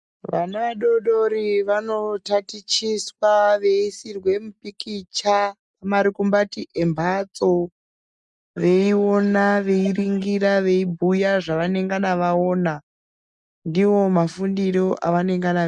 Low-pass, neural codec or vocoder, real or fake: 10.8 kHz; none; real